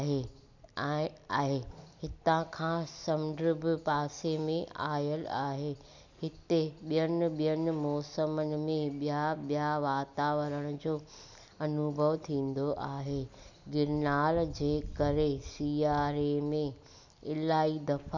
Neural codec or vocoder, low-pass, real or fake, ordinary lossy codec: none; 7.2 kHz; real; none